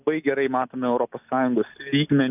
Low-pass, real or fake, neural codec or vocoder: 3.6 kHz; real; none